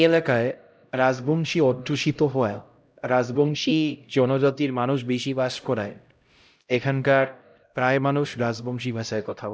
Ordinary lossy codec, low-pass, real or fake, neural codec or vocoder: none; none; fake; codec, 16 kHz, 0.5 kbps, X-Codec, HuBERT features, trained on LibriSpeech